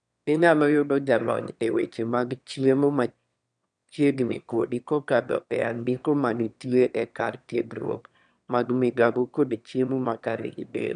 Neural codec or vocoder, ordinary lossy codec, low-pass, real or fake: autoencoder, 22.05 kHz, a latent of 192 numbers a frame, VITS, trained on one speaker; none; 9.9 kHz; fake